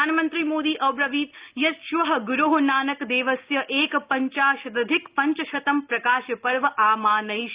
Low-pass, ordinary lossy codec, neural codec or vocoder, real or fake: 3.6 kHz; Opus, 32 kbps; none; real